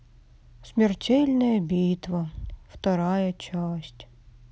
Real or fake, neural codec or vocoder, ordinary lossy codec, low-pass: real; none; none; none